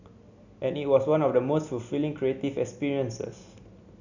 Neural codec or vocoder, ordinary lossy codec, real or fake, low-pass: none; none; real; 7.2 kHz